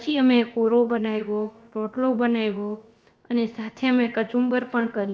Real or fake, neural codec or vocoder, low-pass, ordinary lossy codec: fake; codec, 16 kHz, about 1 kbps, DyCAST, with the encoder's durations; none; none